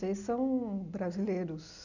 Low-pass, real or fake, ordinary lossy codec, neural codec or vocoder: 7.2 kHz; real; none; none